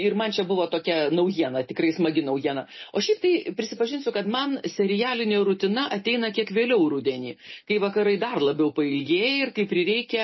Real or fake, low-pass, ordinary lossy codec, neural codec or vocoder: real; 7.2 kHz; MP3, 24 kbps; none